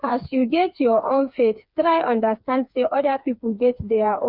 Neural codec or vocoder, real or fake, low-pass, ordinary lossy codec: codec, 16 kHz, 4 kbps, FreqCodec, smaller model; fake; 5.4 kHz; none